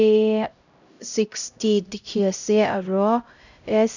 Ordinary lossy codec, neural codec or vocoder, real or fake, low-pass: none; codec, 16 kHz, 0.5 kbps, X-Codec, HuBERT features, trained on LibriSpeech; fake; 7.2 kHz